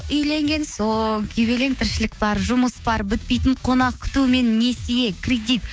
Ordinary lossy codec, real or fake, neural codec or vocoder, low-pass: none; fake; codec, 16 kHz, 6 kbps, DAC; none